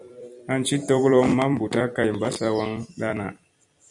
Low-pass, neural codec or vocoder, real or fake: 10.8 kHz; vocoder, 44.1 kHz, 128 mel bands every 256 samples, BigVGAN v2; fake